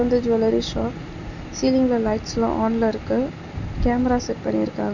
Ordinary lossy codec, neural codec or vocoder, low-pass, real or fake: none; none; 7.2 kHz; real